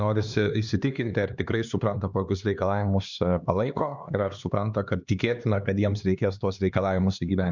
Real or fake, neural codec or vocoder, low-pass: fake; codec, 16 kHz, 2 kbps, X-Codec, HuBERT features, trained on LibriSpeech; 7.2 kHz